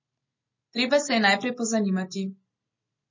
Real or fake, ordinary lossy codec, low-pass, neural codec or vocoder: real; MP3, 32 kbps; 7.2 kHz; none